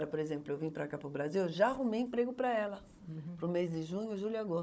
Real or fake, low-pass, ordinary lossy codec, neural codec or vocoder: fake; none; none; codec, 16 kHz, 16 kbps, FunCodec, trained on Chinese and English, 50 frames a second